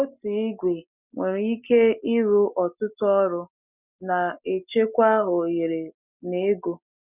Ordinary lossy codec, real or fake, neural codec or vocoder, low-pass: none; real; none; 3.6 kHz